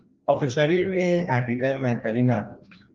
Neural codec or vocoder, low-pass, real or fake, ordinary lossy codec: codec, 16 kHz, 1 kbps, FreqCodec, larger model; 7.2 kHz; fake; Opus, 32 kbps